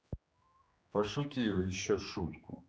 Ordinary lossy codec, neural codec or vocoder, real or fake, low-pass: none; codec, 16 kHz, 2 kbps, X-Codec, HuBERT features, trained on general audio; fake; none